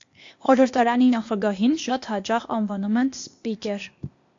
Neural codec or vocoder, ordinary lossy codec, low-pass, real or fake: codec, 16 kHz, 0.8 kbps, ZipCodec; AAC, 48 kbps; 7.2 kHz; fake